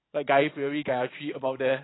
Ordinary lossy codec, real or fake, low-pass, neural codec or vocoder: AAC, 16 kbps; fake; 7.2 kHz; vocoder, 44.1 kHz, 128 mel bands every 512 samples, BigVGAN v2